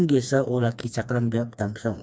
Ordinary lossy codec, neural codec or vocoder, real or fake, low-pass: none; codec, 16 kHz, 2 kbps, FreqCodec, smaller model; fake; none